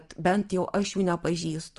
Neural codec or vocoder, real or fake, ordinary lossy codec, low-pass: none; real; Opus, 24 kbps; 10.8 kHz